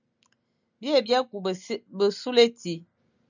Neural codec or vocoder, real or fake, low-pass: none; real; 7.2 kHz